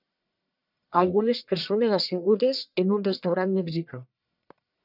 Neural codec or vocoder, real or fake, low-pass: codec, 44.1 kHz, 1.7 kbps, Pupu-Codec; fake; 5.4 kHz